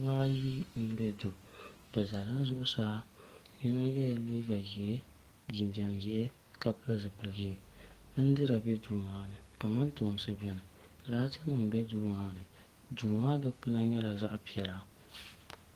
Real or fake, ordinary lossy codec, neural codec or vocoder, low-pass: fake; Opus, 24 kbps; codec, 44.1 kHz, 2.6 kbps, SNAC; 14.4 kHz